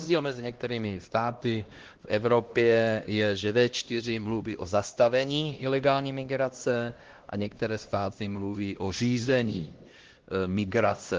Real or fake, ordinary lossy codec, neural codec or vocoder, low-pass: fake; Opus, 16 kbps; codec, 16 kHz, 1 kbps, X-Codec, HuBERT features, trained on LibriSpeech; 7.2 kHz